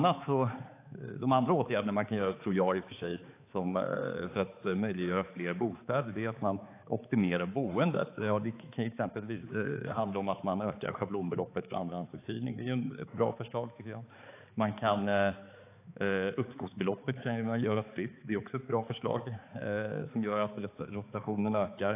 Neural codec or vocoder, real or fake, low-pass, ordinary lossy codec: codec, 16 kHz, 4 kbps, X-Codec, HuBERT features, trained on balanced general audio; fake; 3.6 kHz; AAC, 24 kbps